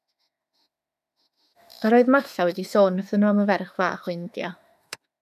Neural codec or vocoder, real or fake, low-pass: autoencoder, 48 kHz, 32 numbers a frame, DAC-VAE, trained on Japanese speech; fake; 14.4 kHz